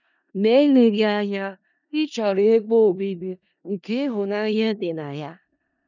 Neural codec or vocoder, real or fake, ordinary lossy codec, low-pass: codec, 16 kHz in and 24 kHz out, 0.4 kbps, LongCat-Audio-Codec, four codebook decoder; fake; none; 7.2 kHz